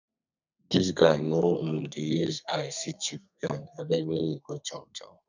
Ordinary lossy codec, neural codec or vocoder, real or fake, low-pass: none; codec, 32 kHz, 1.9 kbps, SNAC; fake; 7.2 kHz